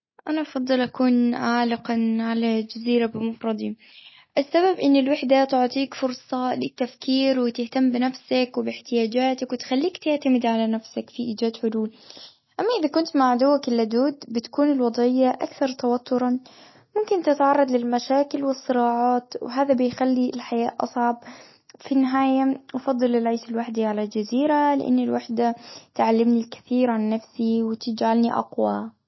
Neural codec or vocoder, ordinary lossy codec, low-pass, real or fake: none; MP3, 24 kbps; 7.2 kHz; real